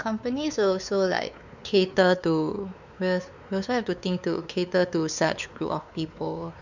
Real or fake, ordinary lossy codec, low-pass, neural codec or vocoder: fake; none; 7.2 kHz; codec, 16 kHz, 4 kbps, X-Codec, WavLM features, trained on Multilingual LibriSpeech